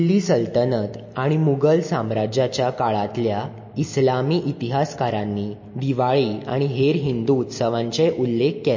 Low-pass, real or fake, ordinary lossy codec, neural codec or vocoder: 7.2 kHz; real; MP3, 32 kbps; none